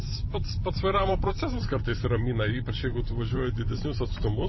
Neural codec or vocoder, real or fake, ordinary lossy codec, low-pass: vocoder, 44.1 kHz, 128 mel bands every 512 samples, BigVGAN v2; fake; MP3, 24 kbps; 7.2 kHz